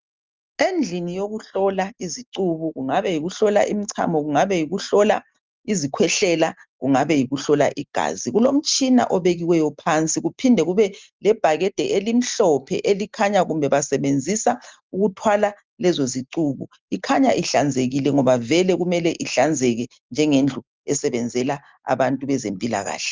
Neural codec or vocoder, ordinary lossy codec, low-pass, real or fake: none; Opus, 32 kbps; 7.2 kHz; real